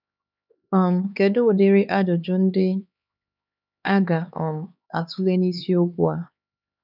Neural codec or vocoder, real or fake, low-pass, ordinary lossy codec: codec, 16 kHz, 4 kbps, X-Codec, HuBERT features, trained on LibriSpeech; fake; 5.4 kHz; none